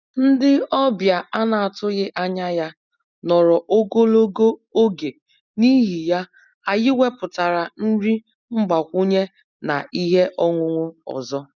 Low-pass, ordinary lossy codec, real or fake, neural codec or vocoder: 7.2 kHz; none; real; none